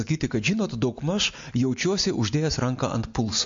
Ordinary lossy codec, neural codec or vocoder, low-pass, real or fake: MP3, 48 kbps; none; 7.2 kHz; real